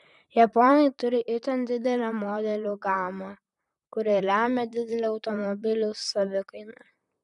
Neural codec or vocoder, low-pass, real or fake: vocoder, 44.1 kHz, 128 mel bands, Pupu-Vocoder; 10.8 kHz; fake